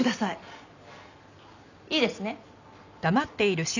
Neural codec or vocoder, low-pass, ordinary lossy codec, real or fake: none; 7.2 kHz; none; real